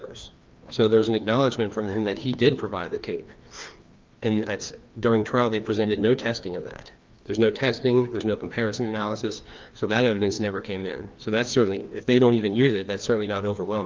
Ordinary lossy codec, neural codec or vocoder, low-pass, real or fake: Opus, 32 kbps; codec, 16 kHz, 2 kbps, FreqCodec, larger model; 7.2 kHz; fake